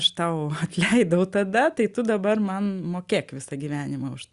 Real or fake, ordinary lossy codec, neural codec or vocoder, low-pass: real; Opus, 64 kbps; none; 10.8 kHz